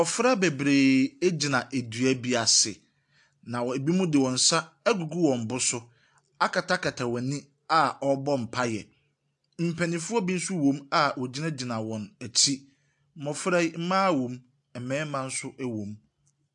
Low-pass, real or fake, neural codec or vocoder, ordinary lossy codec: 10.8 kHz; real; none; AAC, 64 kbps